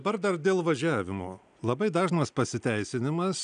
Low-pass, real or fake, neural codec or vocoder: 9.9 kHz; real; none